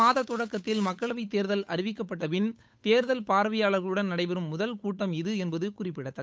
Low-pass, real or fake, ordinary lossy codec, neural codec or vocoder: none; fake; none; codec, 16 kHz, 8 kbps, FunCodec, trained on Chinese and English, 25 frames a second